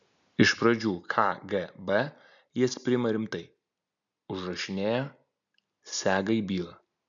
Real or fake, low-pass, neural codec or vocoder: real; 7.2 kHz; none